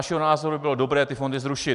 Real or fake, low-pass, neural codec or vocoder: real; 10.8 kHz; none